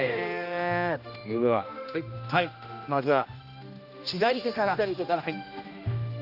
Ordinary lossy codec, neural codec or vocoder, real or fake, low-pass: none; codec, 16 kHz, 1 kbps, X-Codec, HuBERT features, trained on general audio; fake; 5.4 kHz